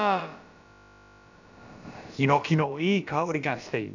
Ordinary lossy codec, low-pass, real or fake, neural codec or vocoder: none; 7.2 kHz; fake; codec, 16 kHz, about 1 kbps, DyCAST, with the encoder's durations